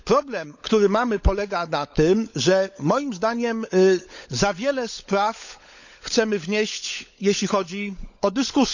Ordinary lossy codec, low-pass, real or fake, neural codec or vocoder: none; 7.2 kHz; fake; codec, 16 kHz, 16 kbps, FunCodec, trained on LibriTTS, 50 frames a second